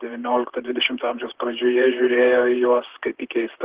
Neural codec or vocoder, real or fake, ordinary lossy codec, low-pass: vocoder, 44.1 kHz, 128 mel bands, Pupu-Vocoder; fake; Opus, 16 kbps; 3.6 kHz